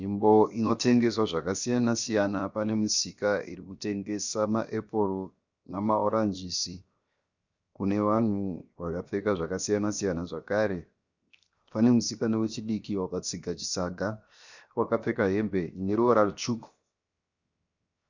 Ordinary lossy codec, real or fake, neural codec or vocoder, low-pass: Opus, 64 kbps; fake; codec, 16 kHz, 0.7 kbps, FocalCodec; 7.2 kHz